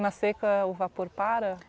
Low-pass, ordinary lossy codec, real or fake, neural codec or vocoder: none; none; real; none